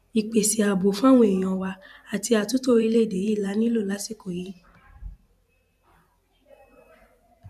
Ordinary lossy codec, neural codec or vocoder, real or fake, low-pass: none; vocoder, 44.1 kHz, 128 mel bands every 512 samples, BigVGAN v2; fake; 14.4 kHz